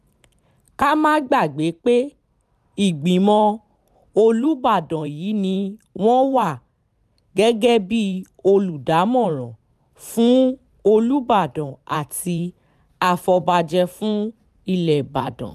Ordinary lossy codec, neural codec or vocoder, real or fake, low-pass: none; vocoder, 44.1 kHz, 128 mel bands every 512 samples, BigVGAN v2; fake; 14.4 kHz